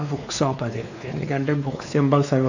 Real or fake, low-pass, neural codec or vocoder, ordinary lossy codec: fake; 7.2 kHz; codec, 16 kHz, 2 kbps, X-Codec, WavLM features, trained on Multilingual LibriSpeech; none